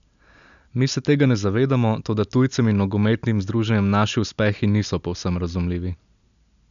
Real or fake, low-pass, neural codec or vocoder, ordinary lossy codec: real; 7.2 kHz; none; none